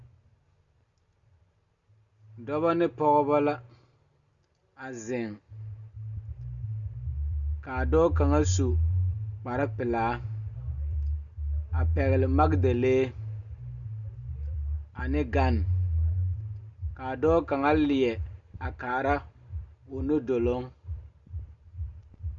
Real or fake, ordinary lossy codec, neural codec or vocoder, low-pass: real; Opus, 64 kbps; none; 7.2 kHz